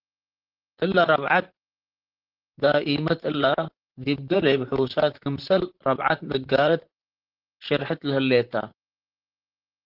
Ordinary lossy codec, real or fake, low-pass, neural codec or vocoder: Opus, 16 kbps; fake; 5.4 kHz; vocoder, 44.1 kHz, 128 mel bands, Pupu-Vocoder